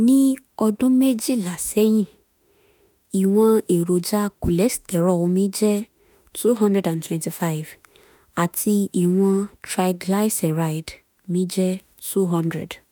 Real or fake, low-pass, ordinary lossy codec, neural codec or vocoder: fake; none; none; autoencoder, 48 kHz, 32 numbers a frame, DAC-VAE, trained on Japanese speech